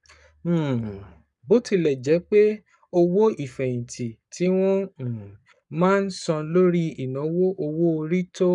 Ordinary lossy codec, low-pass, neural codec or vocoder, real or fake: none; 10.8 kHz; codec, 44.1 kHz, 7.8 kbps, Pupu-Codec; fake